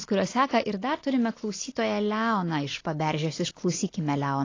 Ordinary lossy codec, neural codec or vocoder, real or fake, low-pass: AAC, 32 kbps; none; real; 7.2 kHz